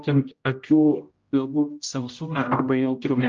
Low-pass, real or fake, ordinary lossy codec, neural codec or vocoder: 7.2 kHz; fake; Opus, 24 kbps; codec, 16 kHz, 0.5 kbps, X-Codec, HuBERT features, trained on general audio